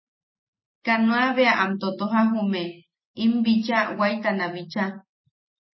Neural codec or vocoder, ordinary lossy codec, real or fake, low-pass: none; MP3, 24 kbps; real; 7.2 kHz